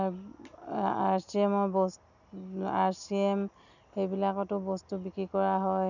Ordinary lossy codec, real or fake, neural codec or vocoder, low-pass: none; real; none; 7.2 kHz